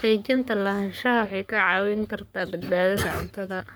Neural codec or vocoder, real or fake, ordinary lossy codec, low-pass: codec, 44.1 kHz, 3.4 kbps, Pupu-Codec; fake; none; none